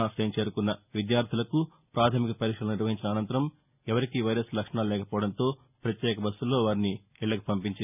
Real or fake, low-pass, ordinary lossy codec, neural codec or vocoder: real; 3.6 kHz; none; none